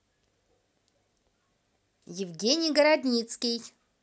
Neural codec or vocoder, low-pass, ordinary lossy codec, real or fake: none; none; none; real